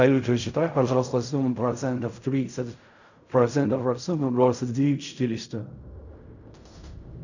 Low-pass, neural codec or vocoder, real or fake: 7.2 kHz; codec, 16 kHz in and 24 kHz out, 0.4 kbps, LongCat-Audio-Codec, fine tuned four codebook decoder; fake